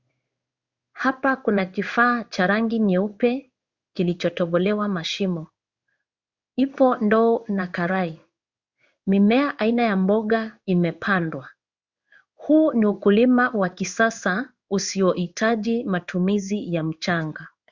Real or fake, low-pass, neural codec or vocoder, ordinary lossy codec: fake; 7.2 kHz; codec, 16 kHz in and 24 kHz out, 1 kbps, XY-Tokenizer; Opus, 64 kbps